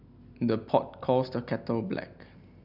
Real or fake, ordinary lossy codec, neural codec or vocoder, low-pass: real; none; none; 5.4 kHz